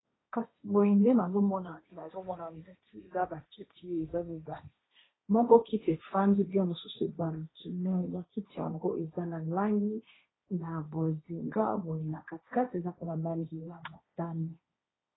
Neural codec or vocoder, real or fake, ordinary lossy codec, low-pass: codec, 16 kHz, 1.1 kbps, Voila-Tokenizer; fake; AAC, 16 kbps; 7.2 kHz